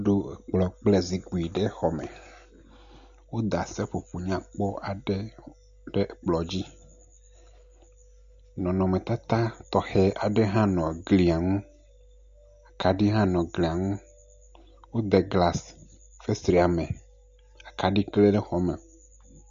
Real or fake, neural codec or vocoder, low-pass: real; none; 7.2 kHz